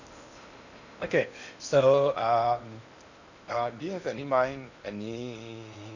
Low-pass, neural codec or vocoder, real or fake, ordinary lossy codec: 7.2 kHz; codec, 16 kHz in and 24 kHz out, 0.8 kbps, FocalCodec, streaming, 65536 codes; fake; none